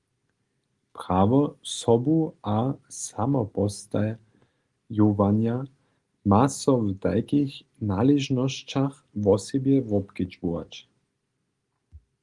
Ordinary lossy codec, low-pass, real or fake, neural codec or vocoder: Opus, 24 kbps; 10.8 kHz; real; none